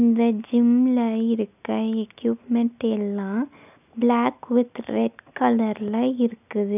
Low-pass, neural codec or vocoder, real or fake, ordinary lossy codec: 3.6 kHz; none; real; none